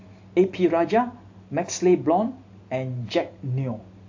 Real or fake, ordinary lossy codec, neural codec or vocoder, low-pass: real; AAC, 48 kbps; none; 7.2 kHz